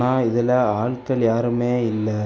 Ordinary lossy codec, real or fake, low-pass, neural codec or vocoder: none; real; none; none